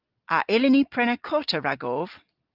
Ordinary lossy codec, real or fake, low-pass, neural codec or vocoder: Opus, 32 kbps; real; 5.4 kHz; none